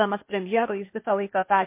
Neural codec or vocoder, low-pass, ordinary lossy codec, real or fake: codec, 16 kHz, 0.8 kbps, ZipCodec; 3.6 kHz; MP3, 24 kbps; fake